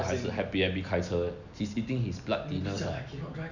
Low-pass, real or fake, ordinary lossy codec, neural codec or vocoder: 7.2 kHz; real; none; none